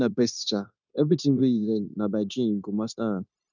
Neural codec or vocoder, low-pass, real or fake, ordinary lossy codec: codec, 16 kHz, 0.9 kbps, LongCat-Audio-Codec; 7.2 kHz; fake; none